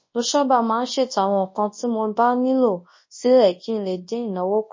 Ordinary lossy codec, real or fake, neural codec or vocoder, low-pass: MP3, 32 kbps; fake; codec, 24 kHz, 0.9 kbps, WavTokenizer, large speech release; 7.2 kHz